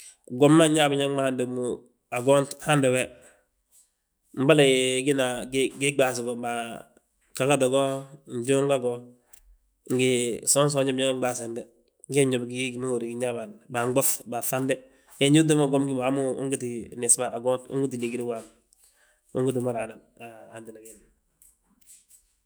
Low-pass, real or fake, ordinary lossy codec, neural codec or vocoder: none; fake; none; autoencoder, 48 kHz, 128 numbers a frame, DAC-VAE, trained on Japanese speech